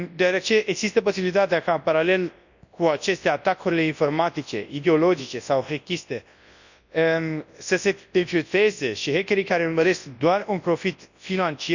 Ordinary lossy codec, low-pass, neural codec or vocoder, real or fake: none; 7.2 kHz; codec, 24 kHz, 0.9 kbps, WavTokenizer, large speech release; fake